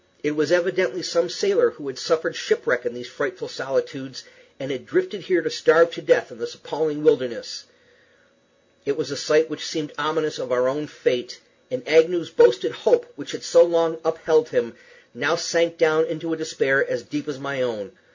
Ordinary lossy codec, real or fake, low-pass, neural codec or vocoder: MP3, 48 kbps; real; 7.2 kHz; none